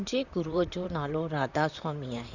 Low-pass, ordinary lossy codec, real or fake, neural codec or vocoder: 7.2 kHz; none; fake; vocoder, 44.1 kHz, 128 mel bands, Pupu-Vocoder